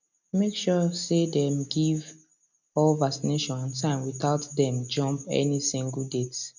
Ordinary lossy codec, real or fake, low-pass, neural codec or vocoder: none; real; 7.2 kHz; none